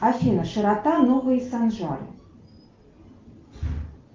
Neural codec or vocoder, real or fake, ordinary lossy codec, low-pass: none; real; Opus, 32 kbps; 7.2 kHz